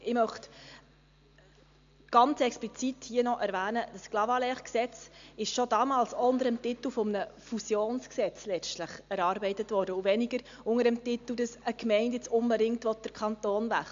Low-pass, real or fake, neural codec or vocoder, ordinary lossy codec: 7.2 kHz; real; none; AAC, 64 kbps